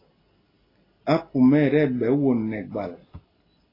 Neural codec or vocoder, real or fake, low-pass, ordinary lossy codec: none; real; 5.4 kHz; AAC, 24 kbps